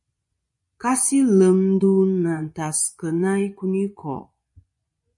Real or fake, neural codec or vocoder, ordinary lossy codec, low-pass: fake; vocoder, 24 kHz, 100 mel bands, Vocos; MP3, 48 kbps; 10.8 kHz